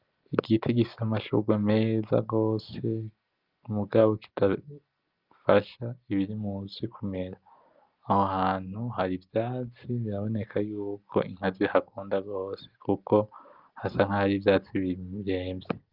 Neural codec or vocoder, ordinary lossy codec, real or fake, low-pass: none; Opus, 32 kbps; real; 5.4 kHz